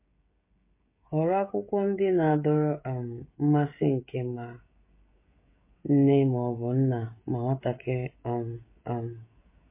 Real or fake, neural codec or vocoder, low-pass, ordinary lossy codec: fake; codec, 16 kHz, 16 kbps, FreqCodec, smaller model; 3.6 kHz; MP3, 24 kbps